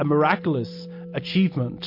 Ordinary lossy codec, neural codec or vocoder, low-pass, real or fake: MP3, 32 kbps; none; 5.4 kHz; real